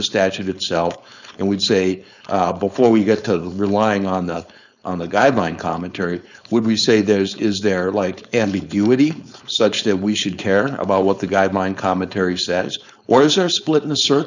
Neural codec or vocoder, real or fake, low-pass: codec, 16 kHz, 4.8 kbps, FACodec; fake; 7.2 kHz